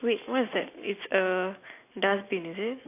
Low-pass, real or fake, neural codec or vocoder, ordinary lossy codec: 3.6 kHz; real; none; AAC, 24 kbps